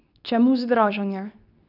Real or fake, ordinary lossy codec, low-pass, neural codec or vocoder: fake; none; 5.4 kHz; codec, 24 kHz, 0.9 kbps, WavTokenizer, medium speech release version 2